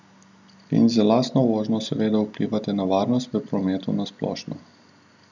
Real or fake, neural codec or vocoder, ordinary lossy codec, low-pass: real; none; none; 7.2 kHz